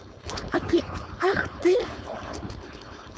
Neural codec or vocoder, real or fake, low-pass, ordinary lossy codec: codec, 16 kHz, 4.8 kbps, FACodec; fake; none; none